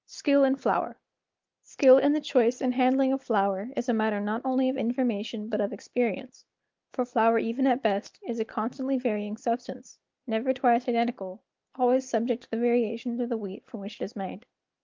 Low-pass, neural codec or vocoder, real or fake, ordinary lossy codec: 7.2 kHz; none; real; Opus, 32 kbps